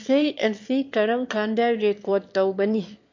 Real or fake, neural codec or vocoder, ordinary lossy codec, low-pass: fake; autoencoder, 22.05 kHz, a latent of 192 numbers a frame, VITS, trained on one speaker; MP3, 48 kbps; 7.2 kHz